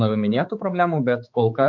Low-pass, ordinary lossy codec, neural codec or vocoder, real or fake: 7.2 kHz; MP3, 48 kbps; codec, 16 kHz, 4 kbps, X-Codec, HuBERT features, trained on balanced general audio; fake